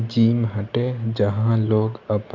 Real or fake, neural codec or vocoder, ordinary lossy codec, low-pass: real; none; none; 7.2 kHz